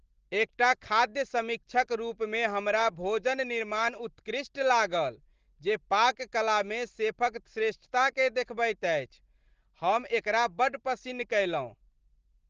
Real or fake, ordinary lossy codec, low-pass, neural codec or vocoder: real; Opus, 16 kbps; 7.2 kHz; none